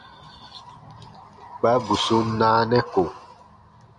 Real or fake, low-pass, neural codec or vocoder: real; 10.8 kHz; none